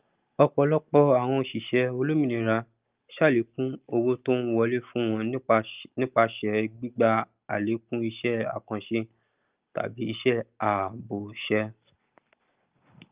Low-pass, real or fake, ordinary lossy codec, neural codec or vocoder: 3.6 kHz; real; Opus, 24 kbps; none